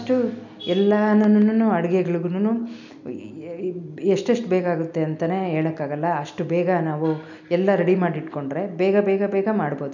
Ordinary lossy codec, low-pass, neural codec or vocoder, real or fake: none; 7.2 kHz; none; real